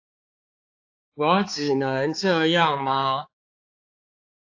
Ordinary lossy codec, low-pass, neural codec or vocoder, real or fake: AAC, 48 kbps; 7.2 kHz; codec, 16 kHz, 4 kbps, X-Codec, HuBERT features, trained on balanced general audio; fake